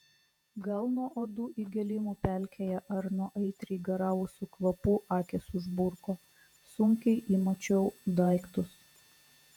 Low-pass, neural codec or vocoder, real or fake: 19.8 kHz; vocoder, 48 kHz, 128 mel bands, Vocos; fake